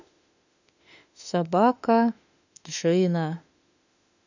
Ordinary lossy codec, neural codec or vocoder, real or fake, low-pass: none; autoencoder, 48 kHz, 32 numbers a frame, DAC-VAE, trained on Japanese speech; fake; 7.2 kHz